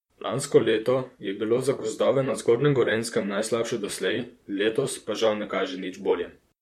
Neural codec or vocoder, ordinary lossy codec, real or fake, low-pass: vocoder, 44.1 kHz, 128 mel bands, Pupu-Vocoder; MP3, 64 kbps; fake; 19.8 kHz